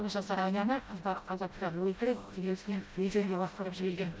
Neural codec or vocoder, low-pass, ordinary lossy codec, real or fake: codec, 16 kHz, 0.5 kbps, FreqCodec, smaller model; none; none; fake